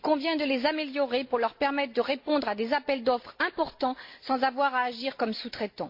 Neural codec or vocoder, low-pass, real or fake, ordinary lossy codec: none; 5.4 kHz; real; MP3, 32 kbps